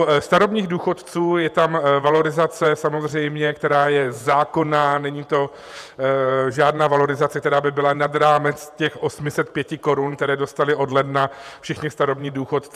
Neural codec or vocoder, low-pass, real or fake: vocoder, 48 kHz, 128 mel bands, Vocos; 14.4 kHz; fake